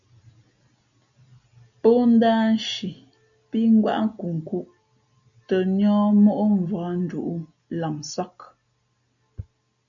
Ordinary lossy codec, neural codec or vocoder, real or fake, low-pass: MP3, 96 kbps; none; real; 7.2 kHz